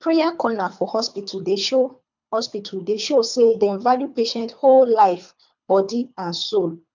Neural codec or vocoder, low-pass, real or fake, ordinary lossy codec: codec, 24 kHz, 3 kbps, HILCodec; 7.2 kHz; fake; MP3, 64 kbps